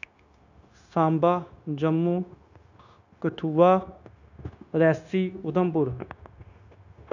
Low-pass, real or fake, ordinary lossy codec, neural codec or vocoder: 7.2 kHz; fake; none; codec, 16 kHz, 0.9 kbps, LongCat-Audio-Codec